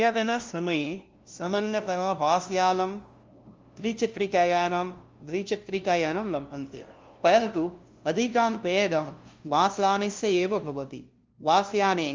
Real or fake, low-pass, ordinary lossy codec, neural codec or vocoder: fake; 7.2 kHz; Opus, 32 kbps; codec, 16 kHz, 0.5 kbps, FunCodec, trained on LibriTTS, 25 frames a second